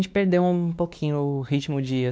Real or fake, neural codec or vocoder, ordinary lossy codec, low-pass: fake; codec, 16 kHz, 2 kbps, X-Codec, WavLM features, trained on Multilingual LibriSpeech; none; none